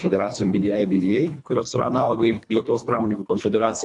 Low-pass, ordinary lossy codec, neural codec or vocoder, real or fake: 10.8 kHz; AAC, 64 kbps; codec, 24 kHz, 1.5 kbps, HILCodec; fake